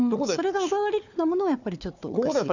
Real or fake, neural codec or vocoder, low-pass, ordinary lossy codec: fake; codec, 16 kHz, 4 kbps, FunCodec, trained on Chinese and English, 50 frames a second; 7.2 kHz; none